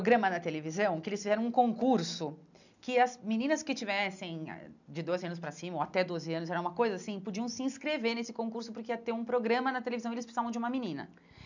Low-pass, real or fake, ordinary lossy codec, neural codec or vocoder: 7.2 kHz; real; none; none